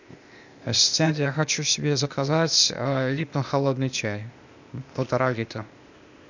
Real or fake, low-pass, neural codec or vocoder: fake; 7.2 kHz; codec, 16 kHz, 0.8 kbps, ZipCodec